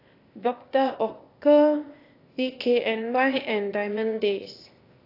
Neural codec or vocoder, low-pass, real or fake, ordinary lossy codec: codec, 16 kHz, 0.8 kbps, ZipCodec; 5.4 kHz; fake; AAC, 32 kbps